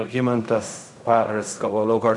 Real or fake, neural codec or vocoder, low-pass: fake; codec, 16 kHz in and 24 kHz out, 0.4 kbps, LongCat-Audio-Codec, fine tuned four codebook decoder; 10.8 kHz